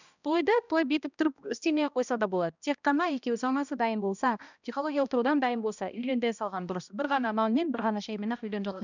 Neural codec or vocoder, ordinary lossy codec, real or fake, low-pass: codec, 16 kHz, 1 kbps, X-Codec, HuBERT features, trained on balanced general audio; none; fake; 7.2 kHz